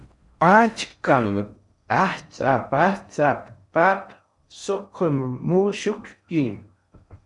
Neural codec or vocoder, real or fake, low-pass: codec, 16 kHz in and 24 kHz out, 0.6 kbps, FocalCodec, streaming, 4096 codes; fake; 10.8 kHz